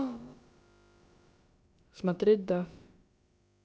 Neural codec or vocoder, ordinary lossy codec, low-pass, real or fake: codec, 16 kHz, about 1 kbps, DyCAST, with the encoder's durations; none; none; fake